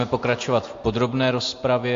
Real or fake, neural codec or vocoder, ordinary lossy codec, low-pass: real; none; AAC, 48 kbps; 7.2 kHz